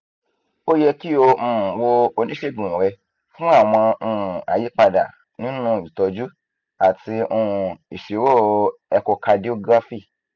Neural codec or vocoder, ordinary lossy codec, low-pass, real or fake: none; none; 7.2 kHz; real